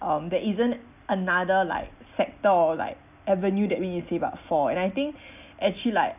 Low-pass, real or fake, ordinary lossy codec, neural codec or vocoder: 3.6 kHz; real; none; none